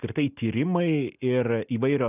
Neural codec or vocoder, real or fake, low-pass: none; real; 3.6 kHz